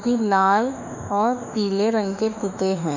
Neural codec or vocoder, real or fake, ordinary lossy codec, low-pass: autoencoder, 48 kHz, 32 numbers a frame, DAC-VAE, trained on Japanese speech; fake; none; 7.2 kHz